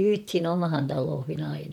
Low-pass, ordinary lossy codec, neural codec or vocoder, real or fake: 19.8 kHz; none; vocoder, 44.1 kHz, 128 mel bands, Pupu-Vocoder; fake